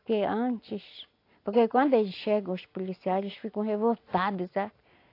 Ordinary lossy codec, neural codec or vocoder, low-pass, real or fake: AAC, 32 kbps; none; 5.4 kHz; real